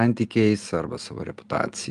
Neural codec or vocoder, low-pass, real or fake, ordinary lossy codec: none; 10.8 kHz; real; Opus, 32 kbps